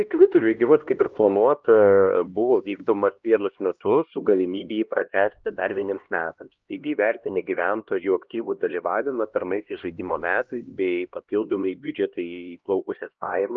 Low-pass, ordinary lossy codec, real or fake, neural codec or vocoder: 7.2 kHz; Opus, 24 kbps; fake; codec, 16 kHz, 1 kbps, X-Codec, HuBERT features, trained on LibriSpeech